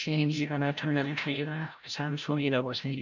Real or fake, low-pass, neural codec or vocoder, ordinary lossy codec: fake; 7.2 kHz; codec, 16 kHz, 0.5 kbps, FreqCodec, larger model; none